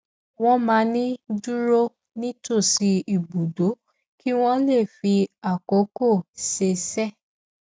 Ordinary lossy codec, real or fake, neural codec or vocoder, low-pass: none; real; none; none